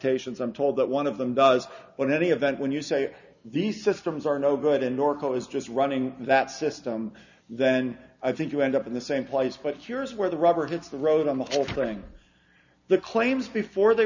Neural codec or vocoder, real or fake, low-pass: none; real; 7.2 kHz